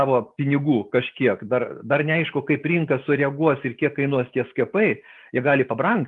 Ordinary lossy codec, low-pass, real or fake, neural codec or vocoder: Opus, 32 kbps; 10.8 kHz; real; none